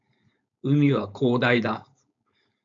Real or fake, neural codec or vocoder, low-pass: fake; codec, 16 kHz, 4.8 kbps, FACodec; 7.2 kHz